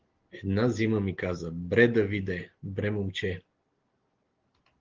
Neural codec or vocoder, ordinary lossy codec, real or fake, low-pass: none; Opus, 16 kbps; real; 7.2 kHz